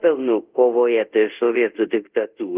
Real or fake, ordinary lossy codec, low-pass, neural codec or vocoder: fake; Opus, 32 kbps; 3.6 kHz; codec, 24 kHz, 0.5 kbps, DualCodec